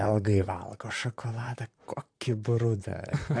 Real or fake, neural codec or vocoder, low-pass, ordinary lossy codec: fake; vocoder, 44.1 kHz, 128 mel bands, Pupu-Vocoder; 9.9 kHz; AAC, 64 kbps